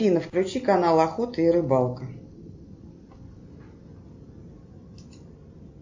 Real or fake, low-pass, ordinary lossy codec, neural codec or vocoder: real; 7.2 kHz; MP3, 48 kbps; none